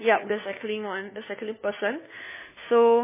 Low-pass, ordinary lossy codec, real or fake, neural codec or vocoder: 3.6 kHz; MP3, 16 kbps; fake; codec, 16 kHz, 4 kbps, FunCodec, trained on LibriTTS, 50 frames a second